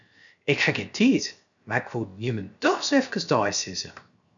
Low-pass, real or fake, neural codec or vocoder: 7.2 kHz; fake; codec, 16 kHz, 0.7 kbps, FocalCodec